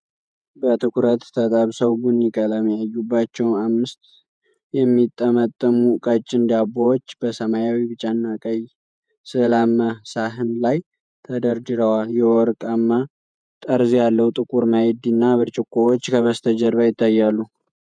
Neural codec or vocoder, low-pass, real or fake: vocoder, 48 kHz, 128 mel bands, Vocos; 9.9 kHz; fake